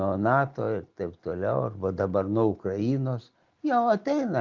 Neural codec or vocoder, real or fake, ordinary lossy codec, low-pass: none; real; Opus, 32 kbps; 7.2 kHz